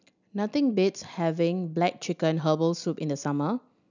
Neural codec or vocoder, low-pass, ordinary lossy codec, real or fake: none; 7.2 kHz; none; real